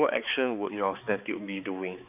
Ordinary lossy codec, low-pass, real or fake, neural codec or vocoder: none; 3.6 kHz; fake; codec, 16 kHz, 4 kbps, X-Codec, HuBERT features, trained on balanced general audio